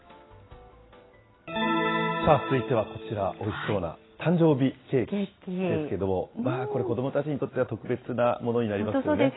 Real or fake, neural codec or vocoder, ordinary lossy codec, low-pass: real; none; AAC, 16 kbps; 7.2 kHz